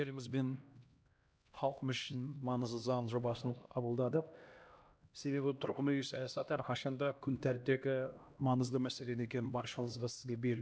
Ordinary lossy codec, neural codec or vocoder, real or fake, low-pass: none; codec, 16 kHz, 1 kbps, X-Codec, HuBERT features, trained on LibriSpeech; fake; none